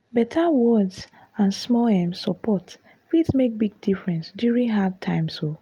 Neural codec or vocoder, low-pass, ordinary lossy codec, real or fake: none; 19.8 kHz; Opus, 32 kbps; real